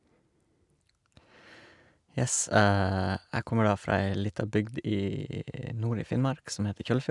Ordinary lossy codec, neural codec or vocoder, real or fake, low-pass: none; none; real; 10.8 kHz